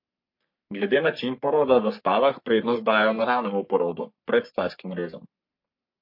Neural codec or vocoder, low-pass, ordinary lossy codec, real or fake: codec, 44.1 kHz, 3.4 kbps, Pupu-Codec; 5.4 kHz; MP3, 32 kbps; fake